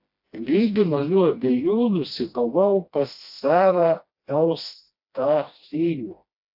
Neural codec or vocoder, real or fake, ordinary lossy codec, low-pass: codec, 16 kHz, 1 kbps, FreqCodec, smaller model; fake; AAC, 48 kbps; 5.4 kHz